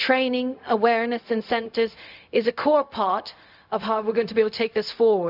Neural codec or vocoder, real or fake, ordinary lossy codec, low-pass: codec, 16 kHz, 0.4 kbps, LongCat-Audio-Codec; fake; none; 5.4 kHz